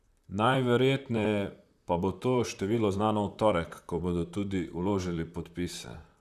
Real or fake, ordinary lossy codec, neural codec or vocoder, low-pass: fake; Opus, 64 kbps; vocoder, 44.1 kHz, 128 mel bands, Pupu-Vocoder; 14.4 kHz